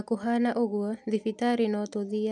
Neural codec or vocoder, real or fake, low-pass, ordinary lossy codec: none; real; none; none